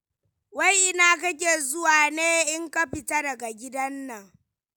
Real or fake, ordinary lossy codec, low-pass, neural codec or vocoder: real; none; none; none